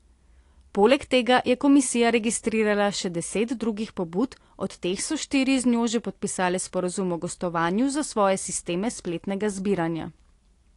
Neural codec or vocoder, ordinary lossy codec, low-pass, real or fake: none; AAC, 48 kbps; 10.8 kHz; real